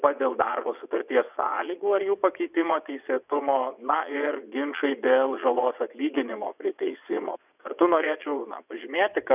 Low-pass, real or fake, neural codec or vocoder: 3.6 kHz; fake; vocoder, 22.05 kHz, 80 mel bands, WaveNeXt